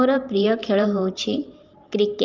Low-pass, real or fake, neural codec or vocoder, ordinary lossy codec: 7.2 kHz; fake; vocoder, 44.1 kHz, 128 mel bands every 512 samples, BigVGAN v2; Opus, 24 kbps